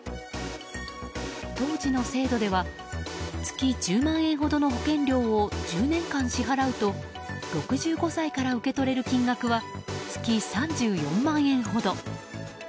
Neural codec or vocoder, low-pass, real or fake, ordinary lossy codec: none; none; real; none